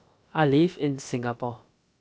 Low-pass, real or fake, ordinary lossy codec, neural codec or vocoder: none; fake; none; codec, 16 kHz, about 1 kbps, DyCAST, with the encoder's durations